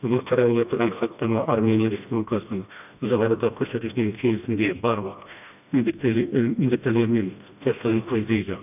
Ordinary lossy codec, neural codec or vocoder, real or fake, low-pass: none; codec, 16 kHz, 1 kbps, FreqCodec, smaller model; fake; 3.6 kHz